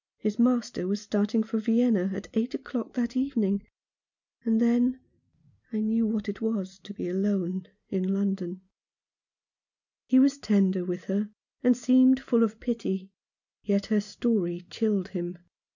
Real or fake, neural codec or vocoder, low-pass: real; none; 7.2 kHz